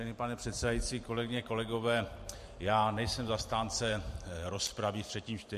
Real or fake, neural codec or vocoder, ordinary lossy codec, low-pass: fake; vocoder, 44.1 kHz, 128 mel bands every 512 samples, BigVGAN v2; MP3, 64 kbps; 14.4 kHz